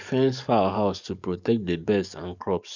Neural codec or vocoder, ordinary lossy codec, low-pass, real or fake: codec, 44.1 kHz, 7.8 kbps, DAC; none; 7.2 kHz; fake